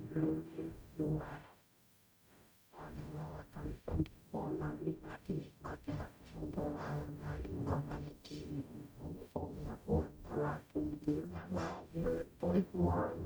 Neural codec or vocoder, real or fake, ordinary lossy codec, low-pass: codec, 44.1 kHz, 0.9 kbps, DAC; fake; none; none